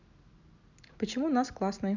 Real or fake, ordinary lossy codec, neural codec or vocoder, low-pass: real; none; none; 7.2 kHz